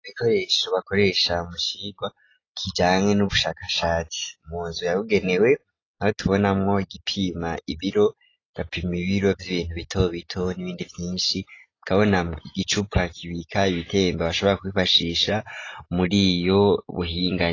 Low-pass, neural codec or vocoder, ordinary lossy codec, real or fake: 7.2 kHz; none; AAC, 32 kbps; real